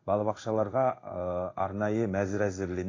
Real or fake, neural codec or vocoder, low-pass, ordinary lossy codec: fake; codec, 16 kHz in and 24 kHz out, 1 kbps, XY-Tokenizer; 7.2 kHz; AAC, 32 kbps